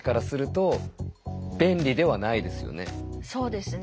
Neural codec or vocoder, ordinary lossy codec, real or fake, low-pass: none; none; real; none